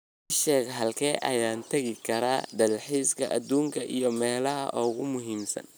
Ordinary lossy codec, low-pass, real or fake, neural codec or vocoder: none; none; real; none